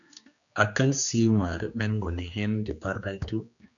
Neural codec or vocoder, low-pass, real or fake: codec, 16 kHz, 2 kbps, X-Codec, HuBERT features, trained on general audio; 7.2 kHz; fake